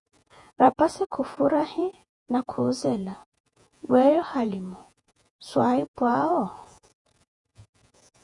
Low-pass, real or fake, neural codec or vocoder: 10.8 kHz; fake; vocoder, 48 kHz, 128 mel bands, Vocos